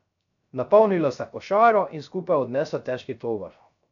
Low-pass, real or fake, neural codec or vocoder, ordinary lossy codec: 7.2 kHz; fake; codec, 16 kHz, 0.3 kbps, FocalCodec; none